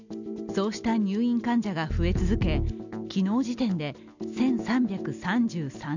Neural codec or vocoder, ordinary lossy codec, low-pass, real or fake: none; none; 7.2 kHz; real